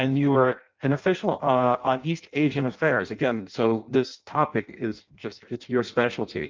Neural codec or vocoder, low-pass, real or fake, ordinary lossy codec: codec, 16 kHz in and 24 kHz out, 0.6 kbps, FireRedTTS-2 codec; 7.2 kHz; fake; Opus, 32 kbps